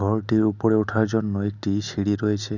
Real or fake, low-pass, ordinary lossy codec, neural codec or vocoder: real; 7.2 kHz; none; none